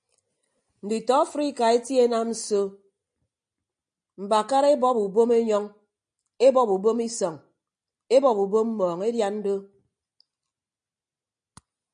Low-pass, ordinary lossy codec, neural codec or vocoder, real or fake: 9.9 kHz; MP3, 64 kbps; none; real